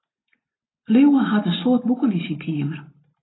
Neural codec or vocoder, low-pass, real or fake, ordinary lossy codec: none; 7.2 kHz; real; AAC, 16 kbps